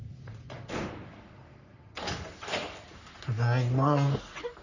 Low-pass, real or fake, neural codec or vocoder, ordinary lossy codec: 7.2 kHz; fake; codec, 44.1 kHz, 3.4 kbps, Pupu-Codec; none